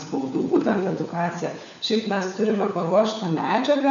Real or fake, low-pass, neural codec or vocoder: fake; 7.2 kHz; codec, 16 kHz, 4 kbps, FunCodec, trained on LibriTTS, 50 frames a second